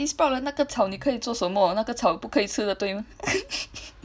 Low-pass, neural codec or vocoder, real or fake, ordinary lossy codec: none; none; real; none